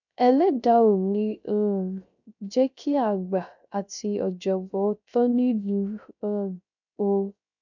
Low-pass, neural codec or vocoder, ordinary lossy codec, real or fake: 7.2 kHz; codec, 16 kHz, 0.3 kbps, FocalCodec; none; fake